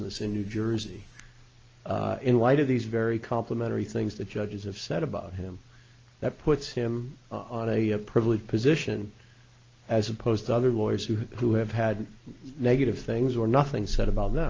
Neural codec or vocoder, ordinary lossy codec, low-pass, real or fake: none; Opus, 24 kbps; 7.2 kHz; real